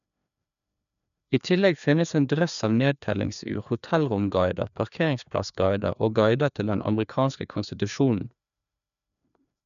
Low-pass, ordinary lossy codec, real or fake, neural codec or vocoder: 7.2 kHz; none; fake; codec, 16 kHz, 2 kbps, FreqCodec, larger model